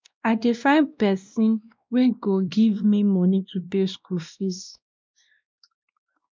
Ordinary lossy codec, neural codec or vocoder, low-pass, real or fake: none; codec, 16 kHz, 1 kbps, X-Codec, WavLM features, trained on Multilingual LibriSpeech; none; fake